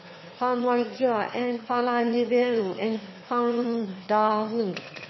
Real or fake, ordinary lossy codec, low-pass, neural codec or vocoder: fake; MP3, 24 kbps; 7.2 kHz; autoencoder, 22.05 kHz, a latent of 192 numbers a frame, VITS, trained on one speaker